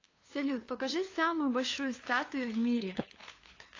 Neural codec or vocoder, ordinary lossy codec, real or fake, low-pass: codec, 16 kHz, 2 kbps, FunCodec, trained on LibriTTS, 25 frames a second; AAC, 32 kbps; fake; 7.2 kHz